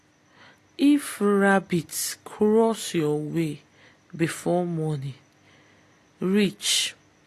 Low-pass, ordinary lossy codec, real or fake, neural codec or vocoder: 14.4 kHz; AAC, 48 kbps; real; none